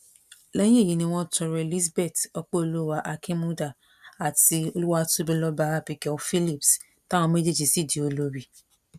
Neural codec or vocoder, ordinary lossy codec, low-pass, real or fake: none; none; 14.4 kHz; real